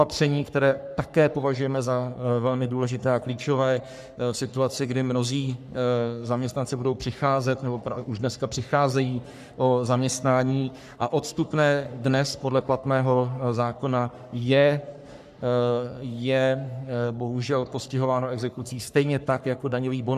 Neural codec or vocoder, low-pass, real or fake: codec, 44.1 kHz, 3.4 kbps, Pupu-Codec; 14.4 kHz; fake